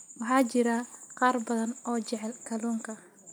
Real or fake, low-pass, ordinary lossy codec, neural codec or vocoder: real; none; none; none